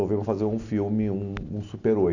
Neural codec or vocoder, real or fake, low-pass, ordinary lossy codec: none; real; 7.2 kHz; none